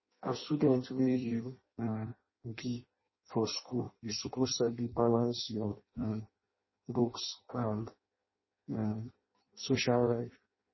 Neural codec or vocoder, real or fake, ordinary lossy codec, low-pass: codec, 16 kHz in and 24 kHz out, 0.6 kbps, FireRedTTS-2 codec; fake; MP3, 24 kbps; 7.2 kHz